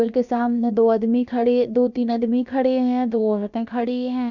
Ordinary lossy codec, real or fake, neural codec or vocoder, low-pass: none; fake; codec, 16 kHz, about 1 kbps, DyCAST, with the encoder's durations; 7.2 kHz